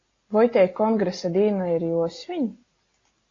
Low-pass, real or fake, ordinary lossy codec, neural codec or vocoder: 7.2 kHz; real; AAC, 32 kbps; none